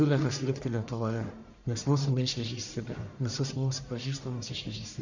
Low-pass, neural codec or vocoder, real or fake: 7.2 kHz; codec, 44.1 kHz, 1.7 kbps, Pupu-Codec; fake